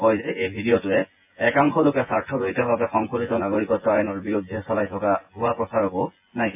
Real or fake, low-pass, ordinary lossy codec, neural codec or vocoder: fake; 3.6 kHz; AAC, 32 kbps; vocoder, 24 kHz, 100 mel bands, Vocos